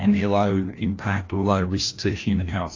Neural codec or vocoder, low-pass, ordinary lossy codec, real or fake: codec, 16 kHz, 1 kbps, FreqCodec, larger model; 7.2 kHz; AAC, 32 kbps; fake